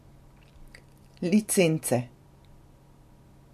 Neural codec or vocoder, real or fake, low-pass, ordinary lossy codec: none; real; 14.4 kHz; MP3, 64 kbps